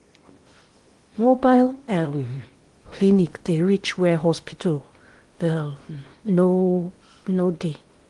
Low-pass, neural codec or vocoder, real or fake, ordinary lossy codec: 10.8 kHz; codec, 16 kHz in and 24 kHz out, 0.8 kbps, FocalCodec, streaming, 65536 codes; fake; Opus, 24 kbps